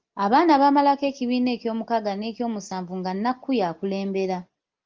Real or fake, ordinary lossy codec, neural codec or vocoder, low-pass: real; Opus, 32 kbps; none; 7.2 kHz